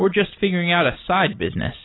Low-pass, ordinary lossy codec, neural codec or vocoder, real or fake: 7.2 kHz; AAC, 16 kbps; none; real